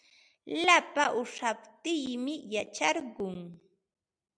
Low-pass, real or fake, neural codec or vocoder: 9.9 kHz; real; none